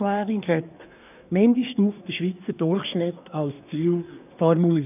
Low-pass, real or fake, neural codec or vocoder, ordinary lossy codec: 3.6 kHz; fake; codec, 24 kHz, 1 kbps, SNAC; none